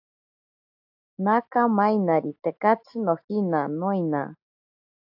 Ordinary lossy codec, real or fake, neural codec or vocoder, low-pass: AAC, 48 kbps; real; none; 5.4 kHz